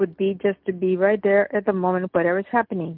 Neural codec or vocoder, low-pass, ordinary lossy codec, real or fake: none; 5.4 kHz; Opus, 32 kbps; real